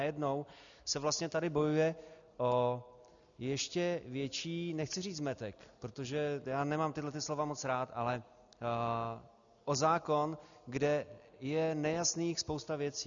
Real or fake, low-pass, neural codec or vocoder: real; 7.2 kHz; none